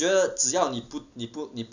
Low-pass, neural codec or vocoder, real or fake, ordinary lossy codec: 7.2 kHz; none; real; none